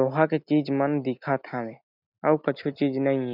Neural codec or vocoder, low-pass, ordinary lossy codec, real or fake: none; 5.4 kHz; none; real